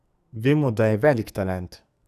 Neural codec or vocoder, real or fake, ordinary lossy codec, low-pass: codec, 44.1 kHz, 2.6 kbps, SNAC; fake; none; 14.4 kHz